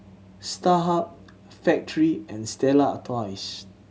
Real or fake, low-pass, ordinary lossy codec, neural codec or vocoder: real; none; none; none